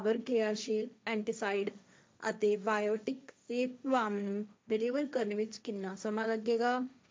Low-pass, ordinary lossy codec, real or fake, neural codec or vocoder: none; none; fake; codec, 16 kHz, 1.1 kbps, Voila-Tokenizer